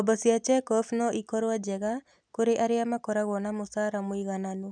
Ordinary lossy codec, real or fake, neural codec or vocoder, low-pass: none; real; none; 9.9 kHz